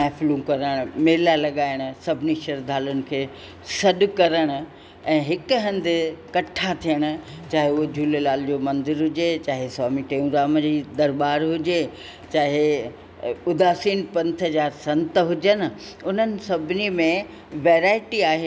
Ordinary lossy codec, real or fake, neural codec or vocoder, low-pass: none; real; none; none